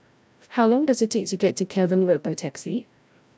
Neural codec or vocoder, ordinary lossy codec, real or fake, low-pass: codec, 16 kHz, 0.5 kbps, FreqCodec, larger model; none; fake; none